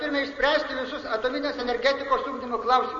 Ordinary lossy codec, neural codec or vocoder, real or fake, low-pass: MP3, 32 kbps; none; real; 7.2 kHz